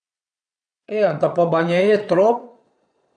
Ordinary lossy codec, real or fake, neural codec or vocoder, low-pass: none; fake; vocoder, 22.05 kHz, 80 mel bands, Vocos; 9.9 kHz